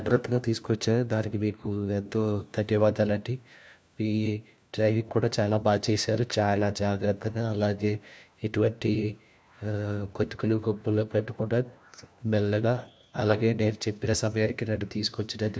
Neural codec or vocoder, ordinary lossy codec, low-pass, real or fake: codec, 16 kHz, 1 kbps, FunCodec, trained on LibriTTS, 50 frames a second; none; none; fake